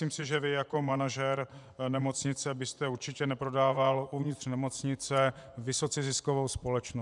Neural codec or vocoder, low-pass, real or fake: vocoder, 24 kHz, 100 mel bands, Vocos; 10.8 kHz; fake